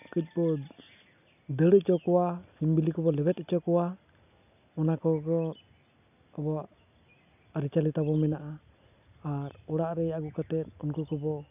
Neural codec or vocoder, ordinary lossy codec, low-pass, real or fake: none; none; 3.6 kHz; real